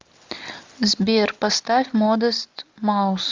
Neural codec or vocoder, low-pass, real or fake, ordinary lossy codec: none; 7.2 kHz; real; Opus, 32 kbps